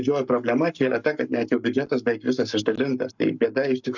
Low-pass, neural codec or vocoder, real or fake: 7.2 kHz; codec, 44.1 kHz, 7.8 kbps, Pupu-Codec; fake